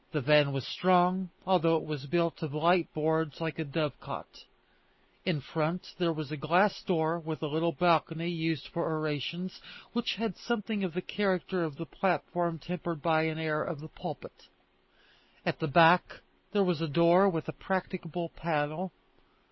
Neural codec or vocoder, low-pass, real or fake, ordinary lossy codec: none; 7.2 kHz; real; MP3, 24 kbps